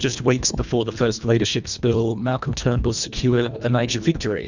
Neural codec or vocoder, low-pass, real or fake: codec, 24 kHz, 1.5 kbps, HILCodec; 7.2 kHz; fake